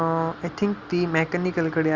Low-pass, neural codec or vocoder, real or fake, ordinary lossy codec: 7.2 kHz; none; real; Opus, 32 kbps